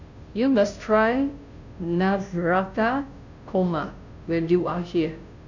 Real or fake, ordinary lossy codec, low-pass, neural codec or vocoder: fake; none; 7.2 kHz; codec, 16 kHz, 0.5 kbps, FunCodec, trained on Chinese and English, 25 frames a second